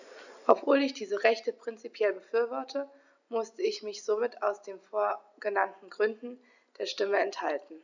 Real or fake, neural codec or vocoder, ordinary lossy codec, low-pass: real; none; none; 7.2 kHz